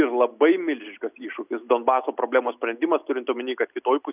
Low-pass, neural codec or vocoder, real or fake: 3.6 kHz; none; real